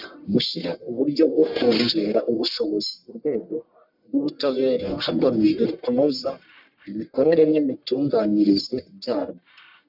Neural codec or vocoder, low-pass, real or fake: codec, 44.1 kHz, 1.7 kbps, Pupu-Codec; 5.4 kHz; fake